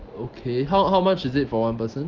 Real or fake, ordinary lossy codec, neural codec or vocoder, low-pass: real; Opus, 24 kbps; none; 7.2 kHz